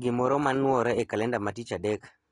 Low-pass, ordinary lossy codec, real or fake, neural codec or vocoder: 10.8 kHz; AAC, 32 kbps; real; none